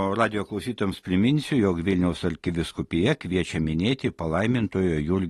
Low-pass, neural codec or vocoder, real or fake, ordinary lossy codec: 19.8 kHz; none; real; AAC, 32 kbps